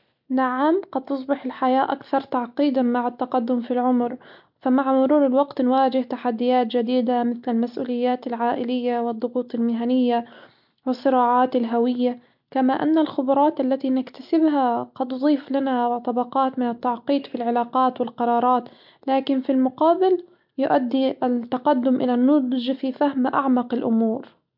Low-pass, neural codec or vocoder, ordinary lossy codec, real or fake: 5.4 kHz; none; MP3, 48 kbps; real